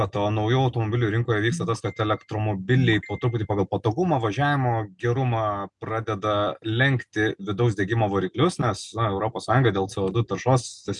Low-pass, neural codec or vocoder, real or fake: 10.8 kHz; none; real